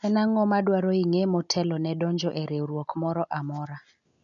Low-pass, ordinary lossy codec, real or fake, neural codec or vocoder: 7.2 kHz; none; real; none